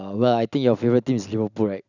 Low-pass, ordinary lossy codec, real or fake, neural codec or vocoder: 7.2 kHz; none; real; none